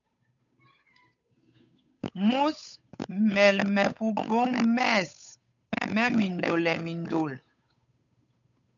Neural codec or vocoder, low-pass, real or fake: codec, 16 kHz, 8 kbps, FunCodec, trained on Chinese and English, 25 frames a second; 7.2 kHz; fake